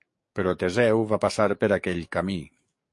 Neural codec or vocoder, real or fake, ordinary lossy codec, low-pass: codec, 44.1 kHz, 7.8 kbps, DAC; fake; MP3, 48 kbps; 10.8 kHz